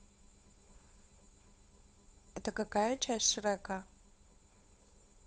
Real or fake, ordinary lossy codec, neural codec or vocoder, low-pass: fake; none; codec, 16 kHz, 8 kbps, FunCodec, trained on Chinese and English, 25 frames a second; none